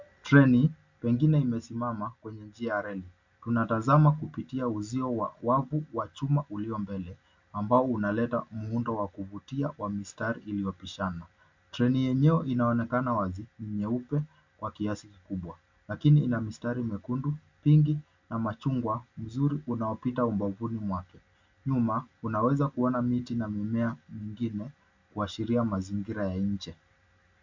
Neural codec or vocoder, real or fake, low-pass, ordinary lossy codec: none; real; 7.2 kHz; MP3, 64 kbps